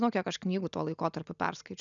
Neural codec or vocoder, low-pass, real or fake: none; 7.2 kHz; real